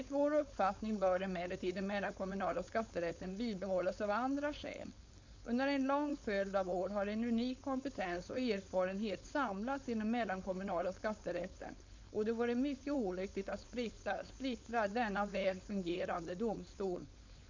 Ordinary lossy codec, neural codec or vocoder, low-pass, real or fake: MP3, 64 kbps; codec, 16 kHz, 4.8 kbps, FACodec; 7.2 kHz; fake